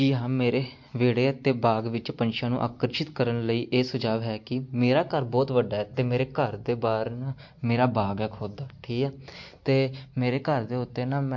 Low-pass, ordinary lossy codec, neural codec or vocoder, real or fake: 7.2 kHz; MP3, 48 kbps; none; real